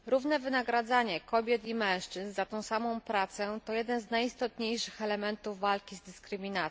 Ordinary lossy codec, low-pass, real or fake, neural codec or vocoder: none; none; real; none